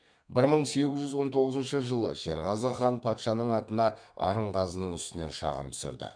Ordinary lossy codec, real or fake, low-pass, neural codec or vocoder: MP3, 96 kbps; fake; 9.9 kHz; codec, 32 kHz, 1.9 kbps, SNAC